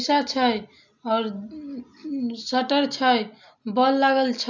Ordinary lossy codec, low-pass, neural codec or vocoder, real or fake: none; 7.2 kHz; none; real